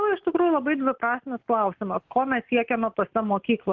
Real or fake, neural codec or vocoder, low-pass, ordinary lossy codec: real; none; 7.2 kHz; Opus, 32 kbps